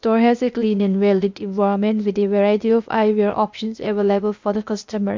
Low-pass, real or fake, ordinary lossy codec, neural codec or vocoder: 7.2 kHz; fake; MP3, 64 kbps; codec, 16 kHz, 0.8 kbps, ZipCodec